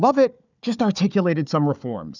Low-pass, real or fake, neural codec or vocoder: 7.2 kHz; fake; codec, 16 kHz, 4 kbps, FunCodec, trained on Chinese and English, 50 frames a second